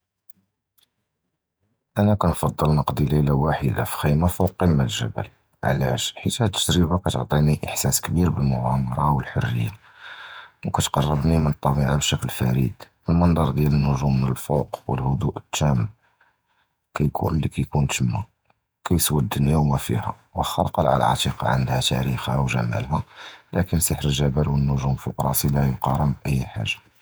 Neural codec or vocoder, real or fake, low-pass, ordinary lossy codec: vocoder, 48 kHz, 128 mel bands, Vocos; fake; none; none